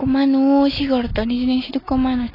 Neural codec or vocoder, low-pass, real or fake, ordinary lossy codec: none; 5.4 kHz; real; AAC, 24 kbps